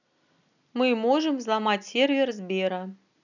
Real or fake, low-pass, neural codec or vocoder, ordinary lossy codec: real; 7.2 kHz; none; none